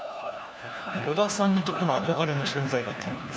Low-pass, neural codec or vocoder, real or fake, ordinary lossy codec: none; codec, 16 kHz, 1 kbps, FunCodec, trained on LibriTTS, 50 frames a second; fake; none